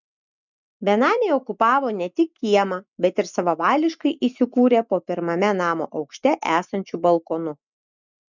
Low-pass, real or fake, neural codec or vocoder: 7.2 kHz; real; none